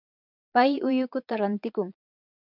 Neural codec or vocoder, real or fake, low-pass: vocoder, 22.05 kHz, 80 mel bands, Vocos; fake; 5.4 kHz